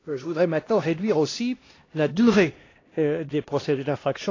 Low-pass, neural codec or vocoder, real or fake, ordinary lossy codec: 7.2 kHz; codec, 16 kHz, 1 kbps, X-Codec, HuBERT features, trained on LibriSpeech; fake; AAC, 32 kbps